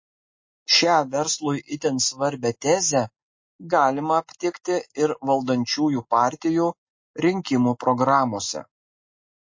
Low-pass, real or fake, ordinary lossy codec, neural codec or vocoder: 7.2 kHz; real; MP3, 32 kbps; none